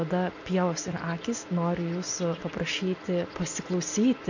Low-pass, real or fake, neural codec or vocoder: 7.2 kHz; real; none